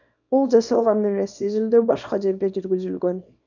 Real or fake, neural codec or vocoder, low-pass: fake; codec, 24 kHz, 0.9 kbps, WavTokenizer, small release; 7.2 kHz